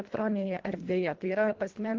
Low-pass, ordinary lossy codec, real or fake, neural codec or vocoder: 7.2 kHz; Opus, 16 kbps; fake; codec, 24 kHz, 1.5 kbps, HILCodec